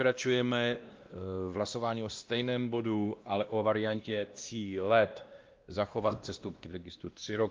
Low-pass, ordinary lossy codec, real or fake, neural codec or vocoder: 7.2 kHz; Opus, 24 kbps; fake; codec, 16 kHz, 1 kbps, X-Codec, WavLM features, trained on Multilingual LibriSpeech